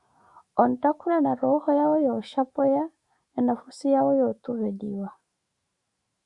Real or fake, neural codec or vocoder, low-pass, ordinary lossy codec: fake; autoencoder, 48 kHz, 128 numbers a frame, DAC-VAE, trained on Japanese speech; 10.8 kHz; Opus, 64 kbps